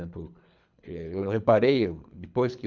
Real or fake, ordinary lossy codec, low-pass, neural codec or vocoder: fake; none; 7.2 kHz; codec, 24 kHz, 3 kbps, HILCodec